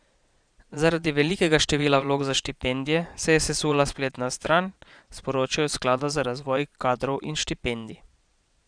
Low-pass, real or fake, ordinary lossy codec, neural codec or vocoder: 9.9 kHz; fake; none; vocoder, 22.05 kHz, 80 mel bands, Vocos